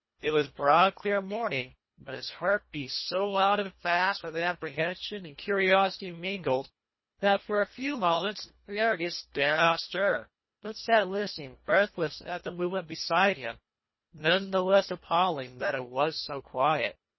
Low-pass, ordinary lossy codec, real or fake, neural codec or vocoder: 7.2 kHz; MP3, 24 kbps; fake; codec, 24 kHz, 1.5 kbps, HILCodec